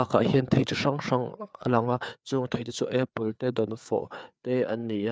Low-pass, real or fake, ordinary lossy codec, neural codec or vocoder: none; fake; none; codec, 16 kHz, 4 kbps, FreqCodec, larger model